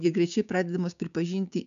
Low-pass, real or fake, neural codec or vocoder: 7.2 kHz; fake; codec, 16 kHz, 6 kbps, DAC